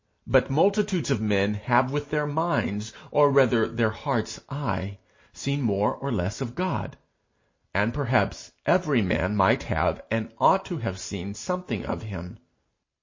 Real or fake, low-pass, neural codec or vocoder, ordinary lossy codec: real; 7.2 kHz; none; MP3, 32 kbps